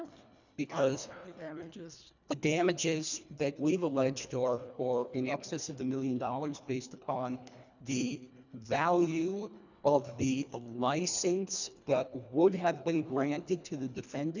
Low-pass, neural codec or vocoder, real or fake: 7.2 kHz; codec, 24 kHz, 1.5 kbps, HILCodec; fake